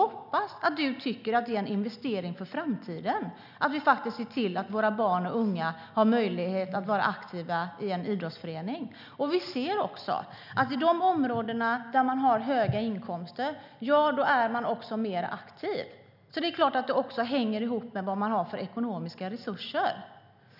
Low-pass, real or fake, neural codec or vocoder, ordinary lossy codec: 5.4 kHz; real; none; none